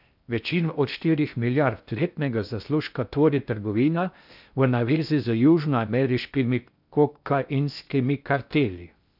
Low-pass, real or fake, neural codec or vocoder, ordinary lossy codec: 5.4 kHz; fake; codec, 16 kHz in and 24 kHz out, 0.6 kbps, FocalCodec, streaming, 2048 codes; none